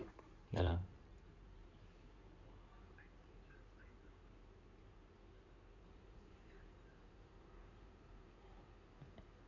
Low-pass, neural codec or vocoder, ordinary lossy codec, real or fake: 7.2 kHz; codec, 16 kHz in and 24 kHz out, 2.2 kbps, FireRedTTS-2 codec; none; fake